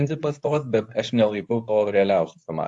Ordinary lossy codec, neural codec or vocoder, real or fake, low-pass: AAC, 64 kbps; codec, 24 kHz, 0.9 kbps, WavTokenizer, medium speech release version 2; fake; 10.8 kHz